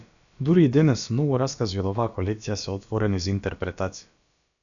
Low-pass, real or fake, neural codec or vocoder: 7.2 kHz; fake; codec, 16 kHz, about 1 kbps, DyCAST, with the encoder's durations